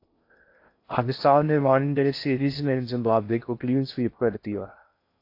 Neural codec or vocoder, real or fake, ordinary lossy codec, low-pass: codec, 16 kHz in and 24 kHz out, 0.6 kbps, FocalCodec, streaming, 2048 codes; fake; AAC, 32 kbps; 5.4 kHz